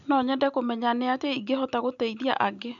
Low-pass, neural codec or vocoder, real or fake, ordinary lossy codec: 7.2 kHz; none; real; none